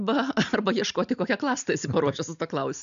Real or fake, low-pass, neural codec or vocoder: real; 7.2 kHz; none